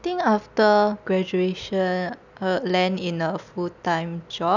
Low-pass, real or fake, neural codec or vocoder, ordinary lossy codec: 7.2 kHz; real; none; none